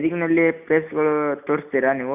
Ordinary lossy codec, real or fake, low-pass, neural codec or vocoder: none; real; 3.6 kHz; none